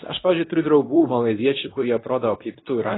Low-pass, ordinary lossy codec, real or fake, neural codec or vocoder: 7.2 kHz; AAC, 16 kbps; fake; codec, 24 kHz, 0.9 kbps, WavTokenizer, medium speech release version 2